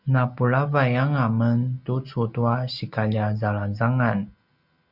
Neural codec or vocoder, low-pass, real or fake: none; 5.4 kHz; real